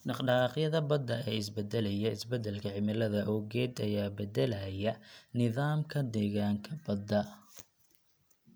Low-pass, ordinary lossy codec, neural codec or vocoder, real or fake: none; none; none; real